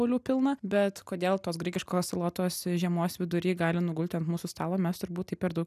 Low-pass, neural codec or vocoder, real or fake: 14.4 kHz; none; real